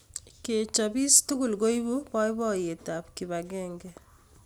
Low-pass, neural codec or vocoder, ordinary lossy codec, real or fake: none; none; none; real